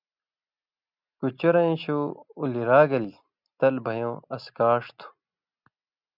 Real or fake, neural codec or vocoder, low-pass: real; none; 5.4 kHz